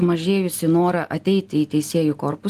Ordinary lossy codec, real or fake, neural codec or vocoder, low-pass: Opus, 24 kbps; real; none; 14.4 kHz